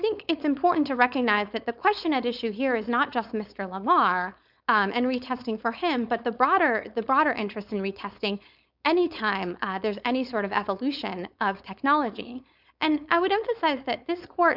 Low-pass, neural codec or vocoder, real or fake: 5.4 kHz; codec, 16 kHz, 4.8 kbps, FACodec; fake